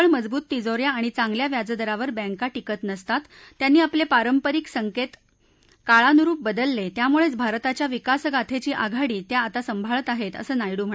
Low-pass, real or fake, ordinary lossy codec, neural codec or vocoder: none; real; none; none